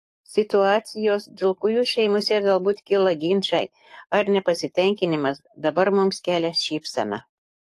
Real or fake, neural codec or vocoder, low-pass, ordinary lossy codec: fake; codec, 44.1 kHz, 7.8 kbps, DAC; 14.4 kHz; AAC, 48 kbps